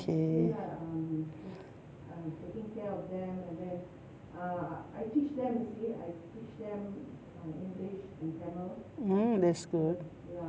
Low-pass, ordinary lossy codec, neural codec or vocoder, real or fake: none; none; none; real